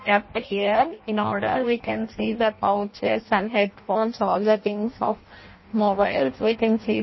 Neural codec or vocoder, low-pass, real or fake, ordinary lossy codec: codec, 16 kHz in and 24 kHz out, 0.6 kbps, FireRedTTS-2 codec; 7.2 kHz; fake; MP3, 24 kbps